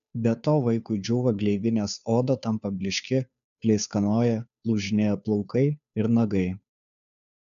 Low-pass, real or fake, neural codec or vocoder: 7.2 kHz; fake; codec, 16 kHz, 2 kbps, FunCodec, trained on Chinese and English, 25 frames a second